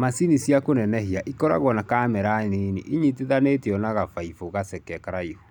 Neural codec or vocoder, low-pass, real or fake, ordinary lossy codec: none; 19.8 kHz; real; none